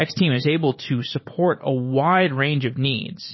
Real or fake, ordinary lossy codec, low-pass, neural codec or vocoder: fake; MP3, 24 kbps; 7.2 kHz; vocoder, 44.1 kHz, 128 mel bands every 512 samples, BigVGAN v2